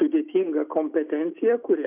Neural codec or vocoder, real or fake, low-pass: none; real; 3.6 kHz